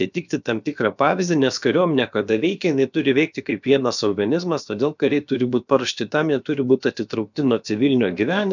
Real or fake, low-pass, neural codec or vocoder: fake; 7.2 kHz; codec, 16 kHz, about 1 kbps, DyCAST, with the encoder's durations